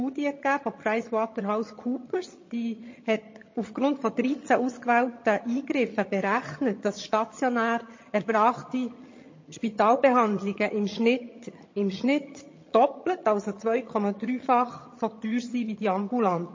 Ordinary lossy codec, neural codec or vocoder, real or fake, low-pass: MP3, 32 kbps; vocoder, 22.05 kHz, 80 mel bands, HiFi-GAN; fake; 7.2 kHz